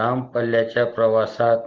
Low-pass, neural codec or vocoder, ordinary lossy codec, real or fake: 7.2 kHz; none; Opus, 16 kbps; real